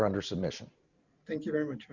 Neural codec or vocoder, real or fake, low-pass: none; real; 7.2 kHz